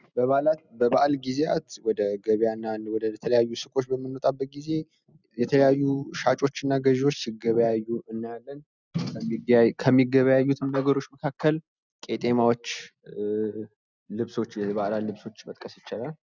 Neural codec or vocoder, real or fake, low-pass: none; real; 7.2 kHz